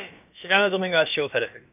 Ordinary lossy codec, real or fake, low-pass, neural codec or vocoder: none; fake; 3.6 kHz; codec, 16 kHz, about 1 kbps, DyCAST, with the encoder's durations